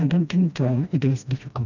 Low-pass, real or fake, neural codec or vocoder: 7.2 kHz; fake; codec, 16 kHz, 1 kbps, FreqCodec, smaller model